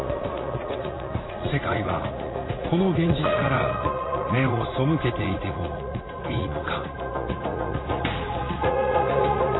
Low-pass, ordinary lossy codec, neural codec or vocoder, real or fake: 7.2 kHz; AAC, 16 kbps; vocoder, 44.1 kHz, 80 mel bands, Vocos; fake